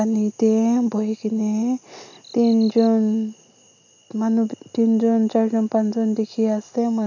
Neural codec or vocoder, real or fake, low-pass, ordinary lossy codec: none; real; 7.2 kHz; none